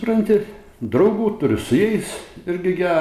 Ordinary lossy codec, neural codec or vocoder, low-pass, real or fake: MP3, 96 kbps; none; 14.4 kHz; real